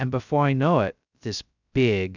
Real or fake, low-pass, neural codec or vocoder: fake; 7.2 kHz; codec, 16 kHz, 0.2 kbps, FocalCodec